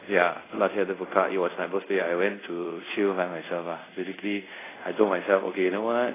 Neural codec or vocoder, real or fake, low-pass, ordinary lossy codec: codec, 24 kHz, 0.5 kbps, DualCodec; fake; 3.6 kHz; AAC, 16 kbps